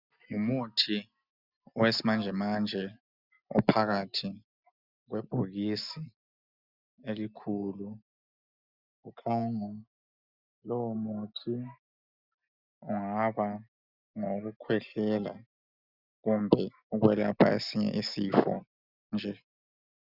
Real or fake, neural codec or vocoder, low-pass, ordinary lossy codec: real; none; 5.4 kHz; Opus, 64 kbps